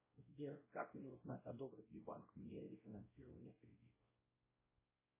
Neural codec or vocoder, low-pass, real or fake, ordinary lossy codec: codec, 16 kHz, 0.5 kbps, X-Codec, WavLM features, trained on Multilingual LibriSpeech; 3.6 kHz; fake; MP3, 16 kbps